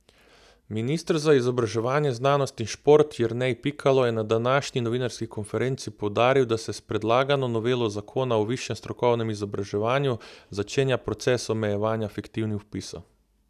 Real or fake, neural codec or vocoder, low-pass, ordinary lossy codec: real; none; 14.4 kHz; none